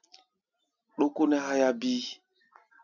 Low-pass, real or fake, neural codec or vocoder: 7.2 kHz; real; none